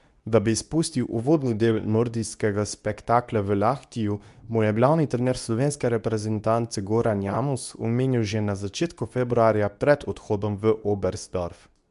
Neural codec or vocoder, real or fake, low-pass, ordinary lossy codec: codec, 24 kHz, 0.9 kbps, WavTokenizer, medium speech release version 2; fake; 10.8 kHz; none